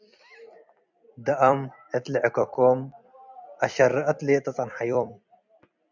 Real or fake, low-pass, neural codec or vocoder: fake; 7.2 kHz; vocoder, 44.1 kHz, 128 mel bands every 256 samples, BigVGAN v2